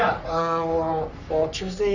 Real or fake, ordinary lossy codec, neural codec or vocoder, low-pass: fake; none; codec, 44.1 kHz, 3.4 kbps, Pupu-Codec; 7.2 kHz